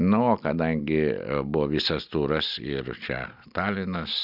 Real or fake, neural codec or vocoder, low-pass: real; none; 5.4 kHz